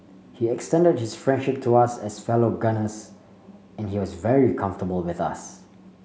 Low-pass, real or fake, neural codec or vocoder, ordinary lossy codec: none; real; none; none